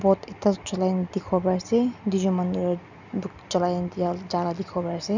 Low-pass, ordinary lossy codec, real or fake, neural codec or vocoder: 7.2 kHz; none; real; none